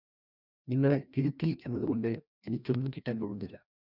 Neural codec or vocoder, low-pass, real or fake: codec, 16 kHz, 1 kbps, FreqCodec, larger model; 5.4 kHz; fake